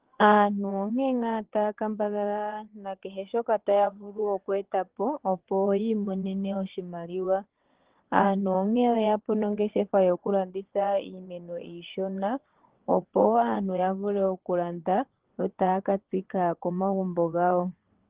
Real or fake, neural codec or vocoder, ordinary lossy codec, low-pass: fake; vocoder, 22.05 kHz, 80 mel bands, WaveNeXt; Opus, 16 kbps; 3.6 kHz